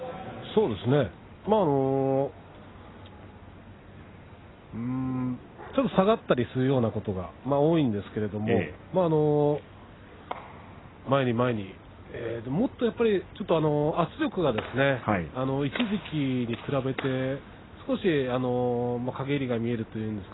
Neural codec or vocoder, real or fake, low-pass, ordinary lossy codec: none; real; 7.2 kHz; AAC, 16 kbps